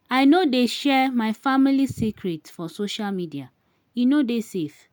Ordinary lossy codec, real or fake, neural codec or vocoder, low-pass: none; real; none; none